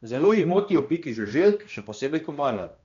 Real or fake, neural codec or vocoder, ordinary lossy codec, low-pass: fake; codec, 16 kHz, 1 kbps, X-Codec, HuBERT features, trained on balanced general audio; MP3, 64 kbps; 7.2 kHz